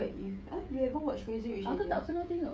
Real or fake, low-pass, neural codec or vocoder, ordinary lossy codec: fake; none; codec, 16 kHz, 16 kbps, FreqCodec, smaller model; none